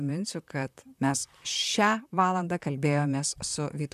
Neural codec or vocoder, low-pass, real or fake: vocoder, 44.1 kHz, 128 mel bands, Pupu-Vocoder; 14.4 kHz; fake